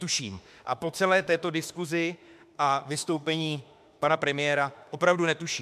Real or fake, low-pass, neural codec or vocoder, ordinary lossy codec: fake; 14.4 kHz; autoencoder, 48 kHz, 32 numbers a frame, DAC-VAE, trained on Japanese speech; MP3, 96 kbps